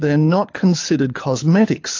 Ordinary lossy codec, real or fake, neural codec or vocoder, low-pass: AAC, 48 kbps; fake; codec, 24 kHz, 6 kbps, HILCodec; 7.2 kHz